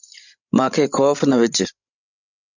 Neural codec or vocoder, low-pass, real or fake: codec, 16 kHz, 16 kbps, FreqCodec, larger model; 7.2 kHz; fake